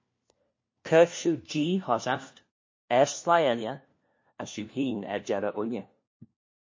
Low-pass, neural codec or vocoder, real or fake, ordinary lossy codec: 7.2 kHz; codec, 16 kHz, 1 kbps, FunCodec, trained on LibriTTS, 50 frames a second; fake; MP3, 32 kbps